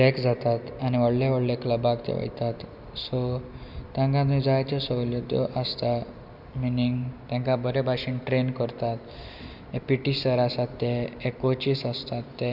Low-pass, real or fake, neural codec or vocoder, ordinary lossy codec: 5.4 kHz; real; none; none